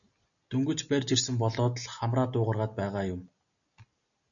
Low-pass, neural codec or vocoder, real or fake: 7.2 kHz; none; real